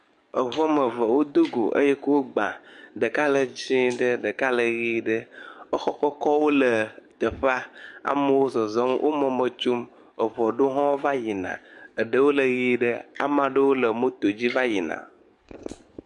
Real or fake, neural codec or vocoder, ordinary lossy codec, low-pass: fake; codec, 44.1 kHz, 7.8 kbps, DAC; MP3, 64 kbps; 10.8 kHz